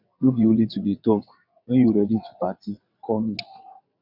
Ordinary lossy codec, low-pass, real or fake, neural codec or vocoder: none; 5.4 kHz; fake; vocoder, 22.05 kHz, 80 mel bands, WaveNeXt